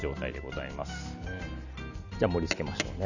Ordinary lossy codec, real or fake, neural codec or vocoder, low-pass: none; real; none; 7.2 kHz